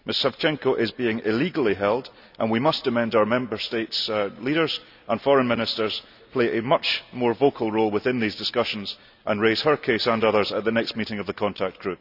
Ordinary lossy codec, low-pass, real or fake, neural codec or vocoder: none; 5.4 kHz; real; none